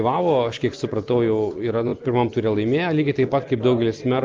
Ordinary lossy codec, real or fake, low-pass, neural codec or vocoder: Opus, 24 kbps; real; 7.2 kHz; none